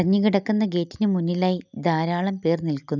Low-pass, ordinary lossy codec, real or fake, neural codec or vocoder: 7.2 kHz; none; real; none